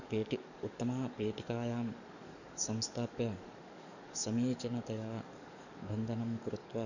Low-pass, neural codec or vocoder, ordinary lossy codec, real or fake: 7.2 kHz; codec, 44.1 kHz, 7.8 kbps, DAC; none; fake